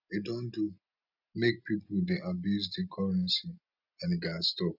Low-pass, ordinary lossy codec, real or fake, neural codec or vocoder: 5.4 kHz; none; real; none